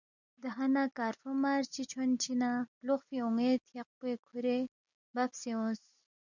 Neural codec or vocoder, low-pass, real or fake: none; 7.2 kHz; real